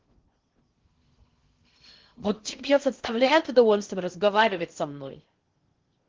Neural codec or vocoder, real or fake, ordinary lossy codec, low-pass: codec, 16 kHz in and 24 kHz out, 0.6 kbps, FocalCodec, streaming, 2048 codes; fake; Opus, 16 kbps; 7.2 kHz